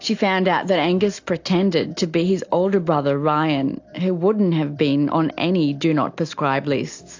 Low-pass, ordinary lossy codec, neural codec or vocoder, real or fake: 7.2 kHz; AAC, 48 kbps; none; real